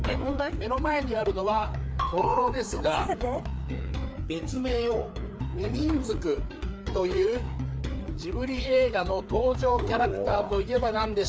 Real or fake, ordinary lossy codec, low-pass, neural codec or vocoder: fake; none; none; codec, 16 kHz, 4 kbps, FreqCodec, larger model